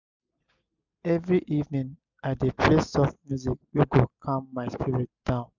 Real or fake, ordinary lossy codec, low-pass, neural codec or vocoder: real; none; 7.2 kHz; none